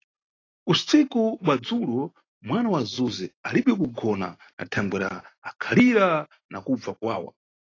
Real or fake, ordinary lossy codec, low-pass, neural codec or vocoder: real; AAC, 32 kbps; 7.2 kHz; none